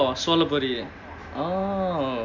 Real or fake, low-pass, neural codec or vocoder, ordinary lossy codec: real; 7.2 kHz; none; none